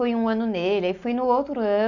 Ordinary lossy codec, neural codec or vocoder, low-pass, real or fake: none; none; 7.2 kHz; real